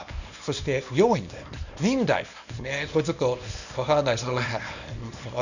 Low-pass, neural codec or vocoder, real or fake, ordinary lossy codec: 7.2 kHz; codec, 24 kHz, 0.9 kbps, WavTokenizer, small release; fake; none